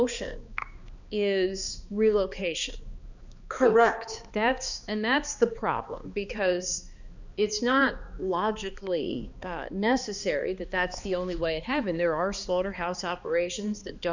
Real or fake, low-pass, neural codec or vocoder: fake; 7.2 kHz; codec, 16 kHz, 2 kbps, X-Codec, HuBERT features, trained on balanced general audio